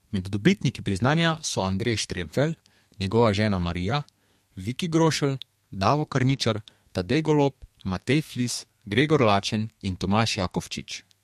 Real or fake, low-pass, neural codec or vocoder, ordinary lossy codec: fake; 14.4 kHz; codec, 32 kHz, 1.9 kbps, SNAC; MP3, 64 kbps